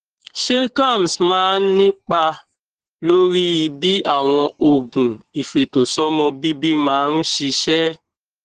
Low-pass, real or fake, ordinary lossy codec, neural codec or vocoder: 14.4 kHz; fake; Opus, 16 kbps; codec, 32 kHz, 1.9 kbps, SNAC